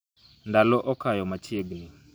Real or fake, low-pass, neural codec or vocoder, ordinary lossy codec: real; none; none; none